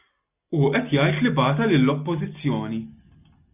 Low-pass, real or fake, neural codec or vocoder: 3.6 kHz; real; none